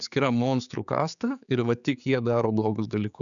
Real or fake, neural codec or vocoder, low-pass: fake; codec, 16 kHz, 4 kbps, X-Codec, HuBERT features, trained on general audio; 7.2 kHz